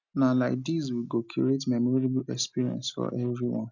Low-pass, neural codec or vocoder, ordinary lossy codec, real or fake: 7.2 kHz; none; none; real